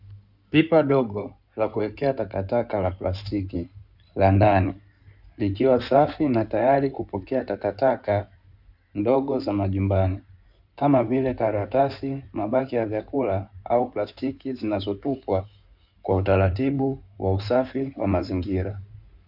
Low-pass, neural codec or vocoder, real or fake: 5.4 kHz; codec, 16 kHz in and 24 kHz out, 2.2 kbps, FireRedTTS-2 codec; fake